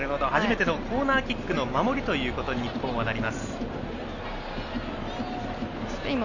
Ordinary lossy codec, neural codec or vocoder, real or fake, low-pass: none; none; real; 7.2 kHz